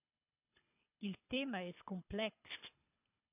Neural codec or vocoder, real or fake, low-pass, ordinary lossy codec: codec, 24 kHz, 6 kbps, HILCodec; fake; 3.6 kHz; AAC, 32 kbps